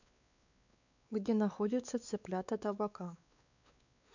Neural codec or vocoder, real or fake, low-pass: codec, 16 kHz, 4 kbps, X-Codec, WavLM features, trained on Multilingual LibriSpeech; fake; 7.2 kHz